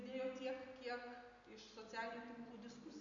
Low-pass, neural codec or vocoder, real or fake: 7.2 kHz; none; real